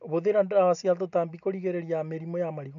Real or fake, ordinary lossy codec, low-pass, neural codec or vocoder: real; none; 7.2 kHz; none